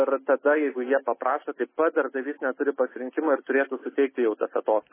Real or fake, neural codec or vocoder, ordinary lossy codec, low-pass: real; none; MP3, 16 kbps; 3.6 kHz